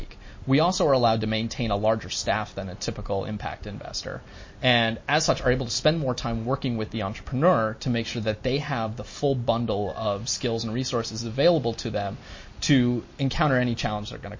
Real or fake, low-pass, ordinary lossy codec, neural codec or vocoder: real; 7.2 kHz; MP3, 32 kbps; none